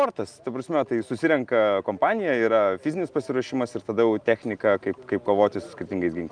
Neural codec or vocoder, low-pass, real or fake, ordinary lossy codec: none; 9.9 kHz; real; Opus, 32 kbps